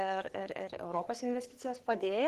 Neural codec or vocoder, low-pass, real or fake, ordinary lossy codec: codec, 44.1 kHz, 3.4 kbps, Pupu-Codec; 14.4 kHz; fake; Opus, 16 kbps